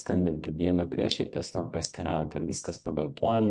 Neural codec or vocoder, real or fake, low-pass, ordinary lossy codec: codec, 24 kHz, 0.9 kbps, WavTokenizer, medium music audio release; fake; 10.8 kHz; MP3, 64 kbps